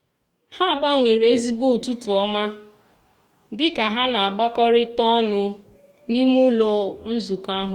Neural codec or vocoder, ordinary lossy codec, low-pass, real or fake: codec, 44.1 kHz, 2.6 kbps, DAC; Opus, 64 kbps; 19.8 kHz; fake